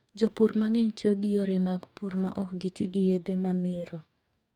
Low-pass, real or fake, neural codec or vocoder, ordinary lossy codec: 19.8 kHz; fake; codec, 44.1 kHz, 2.6 kbps, DAC; none